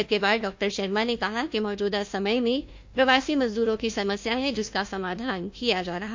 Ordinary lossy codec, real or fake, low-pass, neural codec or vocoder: MP3, 48 kbps; fake; 7.2 kHz; codec, 16 kHz, 1 kbps, FunCodec, trained on Chinese and English, 50 frames a second